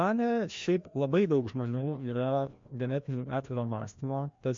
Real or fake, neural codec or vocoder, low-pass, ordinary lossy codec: fake; codec, 16 kHz, 1 kbps, FreqCodec, larger model; 7.2 kHz; MP3, 48 kbps